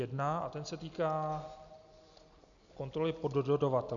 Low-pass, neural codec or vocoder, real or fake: 7.2 kHz; none; real